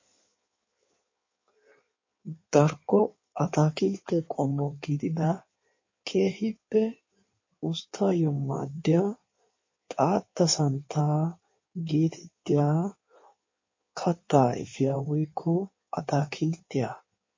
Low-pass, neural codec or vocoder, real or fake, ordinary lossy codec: 7.2 kHz; codec, 16 kHz in and 24 kHz out, 1.1 kbps, FireRedTTS-2 codec; fake; MP3, 32 kbps